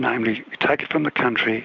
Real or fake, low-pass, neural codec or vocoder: real; 7.2 kHz; none